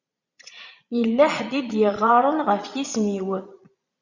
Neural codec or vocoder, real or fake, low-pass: vocoder, 22.05 kHz, 80 mel bands, Vocos; fake; 7.2 kHz